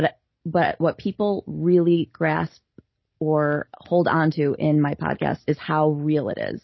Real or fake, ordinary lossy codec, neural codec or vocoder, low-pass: real; MP3, 24 kbps; none; 7.2 kHz